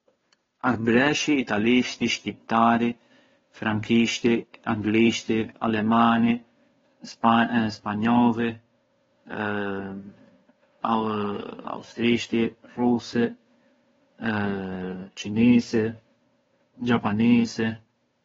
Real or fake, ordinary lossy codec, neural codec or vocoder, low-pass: fake; AAC, 24 kbps; codec, 16 kHz, 2 kbps, FunCodec, trained on Chinese and English, 25 frames a second; 7.2 kHz